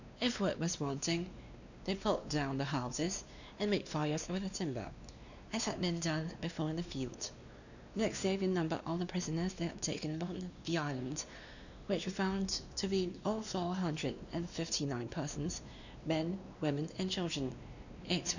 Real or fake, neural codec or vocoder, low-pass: fake; codec, 16 kHz, 2 kbps, X-Codec, WavLM features, trained on Multilingual LibriSpeech; 7.2 kHz